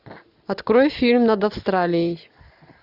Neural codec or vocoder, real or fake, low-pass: none; real; 5.4 kHz